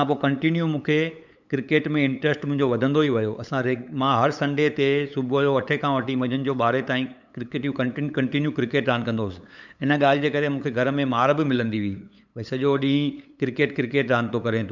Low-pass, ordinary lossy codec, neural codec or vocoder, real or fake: 7.2 kHz; none; codec, 16 kHz, 8 kbps, FunCodec, trained on LibriTTS, 25 frames a second; fake